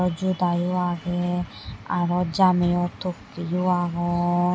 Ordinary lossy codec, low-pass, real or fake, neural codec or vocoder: none; none; real; none